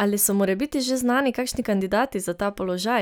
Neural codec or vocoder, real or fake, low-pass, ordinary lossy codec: none; real; none; none